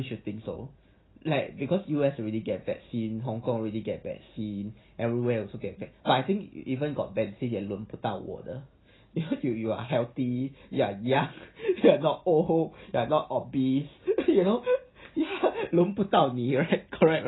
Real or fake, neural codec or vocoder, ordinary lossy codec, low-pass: real; none; AAC, 16 kbps; 7.2 kHz